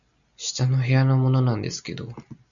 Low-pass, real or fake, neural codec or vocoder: 7.2 kHz; real; none